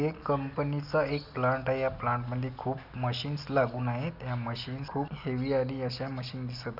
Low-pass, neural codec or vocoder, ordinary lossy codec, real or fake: 5.4 kHz; none; none; real